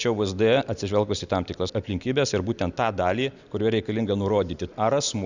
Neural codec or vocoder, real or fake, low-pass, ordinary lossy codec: none; real; 7.2 kHz; Opus, 64 kbps